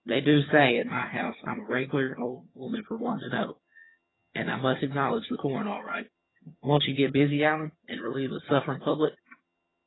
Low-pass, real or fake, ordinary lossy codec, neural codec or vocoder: 7.2 kHz; fake; AAC, 16 kbps; vocoder, 22.05 kHz, 80 mel bands, HiFi-GAN